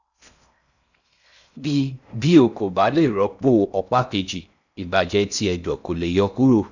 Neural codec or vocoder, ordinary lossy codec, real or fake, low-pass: codec, 16 kHz in and 24 kHz out, 0.6 kbps, FocalCodec, streaming, 4096 codes; none; fake; 7.2 kHz